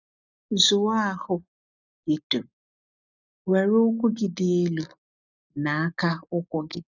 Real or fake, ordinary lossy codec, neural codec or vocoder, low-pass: real; none; none; 7.2 kHz